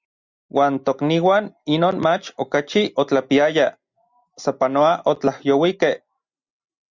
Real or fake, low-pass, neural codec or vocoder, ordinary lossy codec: real; 7.2 kHz; none; Opus, 64 kbps